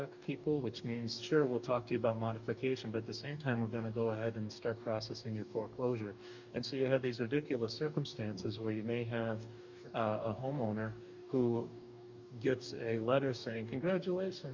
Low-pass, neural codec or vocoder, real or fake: 7.2 kHz; codec, 44.1 kHz, 2.6 kbps, DAC; fake